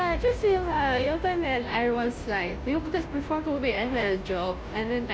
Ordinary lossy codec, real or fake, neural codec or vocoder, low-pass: none; fake; codec, 16 kHz, 0.5 kbps, FunCodec, trained on Chinese and English, 25 frames a second; none